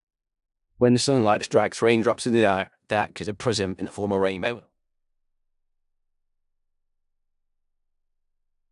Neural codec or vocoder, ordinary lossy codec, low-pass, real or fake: codec, 16 kHz in and 24 kHz out, 0.4 kbps, LongCat-Audio-Codec, four codebook decoder; none; 10.8 kHz; fake